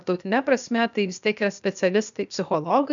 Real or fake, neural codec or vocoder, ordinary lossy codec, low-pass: fake; codec, 16 kHz, 0.8 kbps, ZipCodec; MP3, 96 kbps; 7.2 kHz